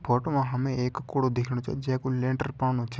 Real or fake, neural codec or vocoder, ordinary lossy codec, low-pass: real; none; none; none